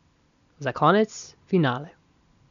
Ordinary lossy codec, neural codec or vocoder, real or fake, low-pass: none; none; real; 7.2 kHz